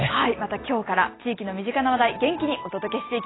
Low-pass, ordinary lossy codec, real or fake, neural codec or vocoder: 7.2 kHz; AAC, 16 kbps; real; none